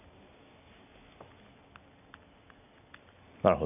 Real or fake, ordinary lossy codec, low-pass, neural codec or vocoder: real; none; 3.6 kHz; none